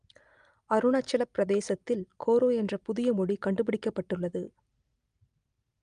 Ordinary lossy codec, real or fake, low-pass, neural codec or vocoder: Opus, 32 kbps; real; 9.9 kHz; none